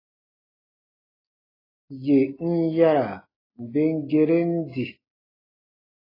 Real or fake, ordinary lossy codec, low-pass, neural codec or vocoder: real; AAC, 24 kbps; 5.4 kHz; none